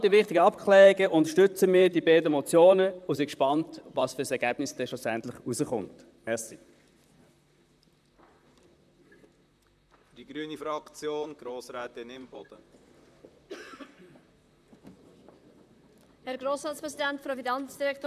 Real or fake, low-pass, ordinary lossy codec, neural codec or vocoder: fake; 14.4 kHz; none; vocoder, 44.1 kHz, 128 mel bands, Pupu-Vocoder